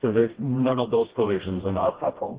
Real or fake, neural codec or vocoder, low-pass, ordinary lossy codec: fake; codec, 16 kHz, 1 kbps, FreqCodec, smaller model; 3.6 kHz; Opus, 16 kbps